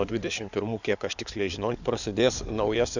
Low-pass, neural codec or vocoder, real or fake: 7.2 kHz; codec, 16 kHz in and 24 kHz out, 2.2 kbps, FireRedTTS-2 codec; fake